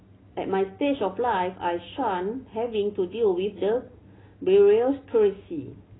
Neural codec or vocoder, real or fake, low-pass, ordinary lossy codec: none; real; 7.2 kHz; AAC, 16 kbps